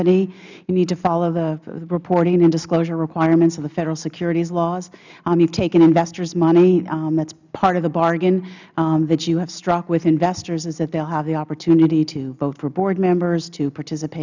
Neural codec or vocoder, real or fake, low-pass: none; real; 7.2 kHz